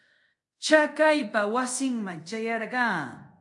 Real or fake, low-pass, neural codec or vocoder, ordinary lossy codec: fake; 10.8 kHz; codec, 24 kHz, 0.5 kbps, DualCodec; MP3, 48 kbps